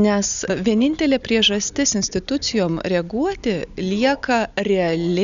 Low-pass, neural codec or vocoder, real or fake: 7.2 kHz; none; real